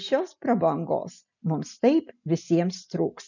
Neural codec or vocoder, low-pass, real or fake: none; 7.2 kHz; real